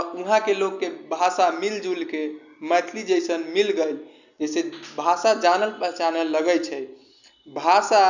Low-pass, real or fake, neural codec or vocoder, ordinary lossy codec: 7.2 kHz; real; none; none